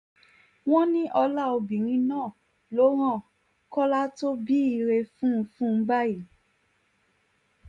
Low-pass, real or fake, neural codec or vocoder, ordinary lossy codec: 10.8 kHz; real; none; none